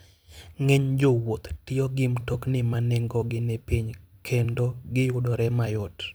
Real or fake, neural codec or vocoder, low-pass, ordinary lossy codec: fake; vocoder, 44.1 kHz, 128 mel bands every 256 samples, BigVGAN v2; none; none